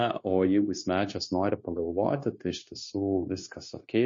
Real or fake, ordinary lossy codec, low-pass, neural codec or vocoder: fake; MP3, 32 kbps; 7.2 kHz; codec, 16 kHz, 0.9 kbps, LongCat-Audio-Codec